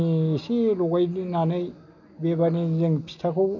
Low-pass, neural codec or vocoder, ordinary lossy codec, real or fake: 7.2 kHz; none; none; real